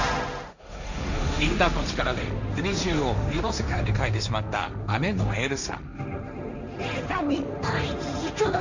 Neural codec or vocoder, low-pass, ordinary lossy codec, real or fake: codec, 16 kHz, 1.1 kbps, Voila-Tokenizer; 7.2 kHz; none; fake